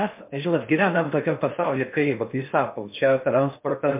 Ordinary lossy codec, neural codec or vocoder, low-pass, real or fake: MP3, 32 kbps; codec, 16 kHz in and 24 kHz out, 0.6 kbps, FocalCodec, streaming, 4096 codes; 3.6 kHz; fake